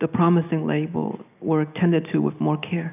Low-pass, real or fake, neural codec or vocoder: 3.6 kHz; real; none